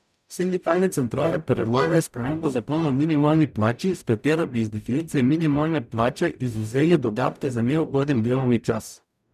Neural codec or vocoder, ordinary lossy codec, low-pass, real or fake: codec, 44.1 kHz, 0.9 kbps, DAC; none; 14.4 kHz; fake